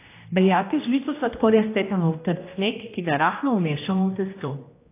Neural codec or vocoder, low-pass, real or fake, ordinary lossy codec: codec, 16 kHz, 1 kbps, X-Codec, HuBERT features, trained on general audio; 3.6 kHz; fake; AAC, 24 kbps